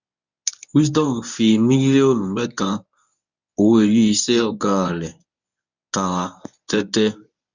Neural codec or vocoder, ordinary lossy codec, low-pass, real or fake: codec, 24 kHz, 0.9 kbps, WavTokenizer, medium speech release version 1; none; 7.2 kHz; fake